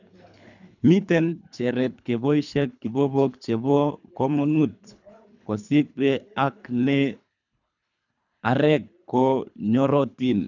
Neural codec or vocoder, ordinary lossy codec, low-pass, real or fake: codec, 24 kHz, 3 kbps, HILCodec; none; 7.2 kHz; fake